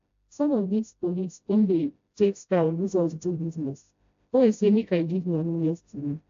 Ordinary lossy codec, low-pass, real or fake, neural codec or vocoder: AAC, 48 kbps; 7.2 kHz; fake; codec, 16 kHz, 0.5 kbps, FreqCodec, smaller model